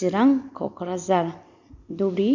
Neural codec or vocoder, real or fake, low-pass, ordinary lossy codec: none; real; 7.2 kHz; none